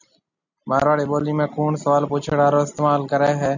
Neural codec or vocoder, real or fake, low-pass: none; real; 7.2 kHz